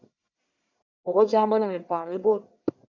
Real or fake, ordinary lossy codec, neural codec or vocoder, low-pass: fake; MP3, 64 kbps; codec, 44.1 kHz, 1.7 kbps, Pupu-Codec; 7.2 kHz